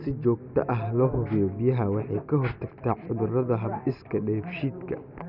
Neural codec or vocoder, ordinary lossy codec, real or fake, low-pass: none; none; real; 5.4 kHz